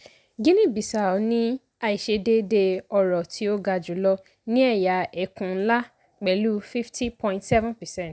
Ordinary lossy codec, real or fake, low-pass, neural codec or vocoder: none; real; none; none